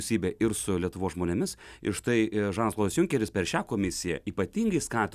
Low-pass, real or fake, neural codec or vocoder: 14.4 kHz; real; none